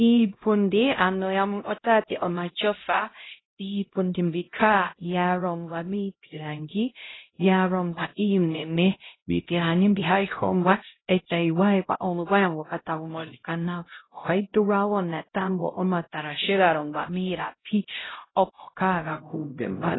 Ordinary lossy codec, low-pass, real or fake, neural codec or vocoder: AAC, 16 kbps; 7.2 kHz; fake; codec, 16 kHz, 0.5 kbps, X-Codec, HuBERT features, trained on LibriSpeech